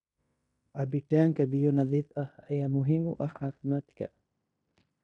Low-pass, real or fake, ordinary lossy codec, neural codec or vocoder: 10.8 kHz; fake; none; codec, 16 kHz in and 24 kHz out, 0.9 kbps, LongCat-Audio-Codec, fine tuned four codebook decoder